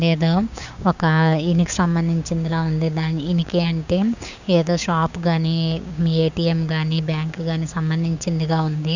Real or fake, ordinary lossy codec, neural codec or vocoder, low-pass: fake; none; codec, 16 kHz, 6 kbps, DAC; 7.2 kHz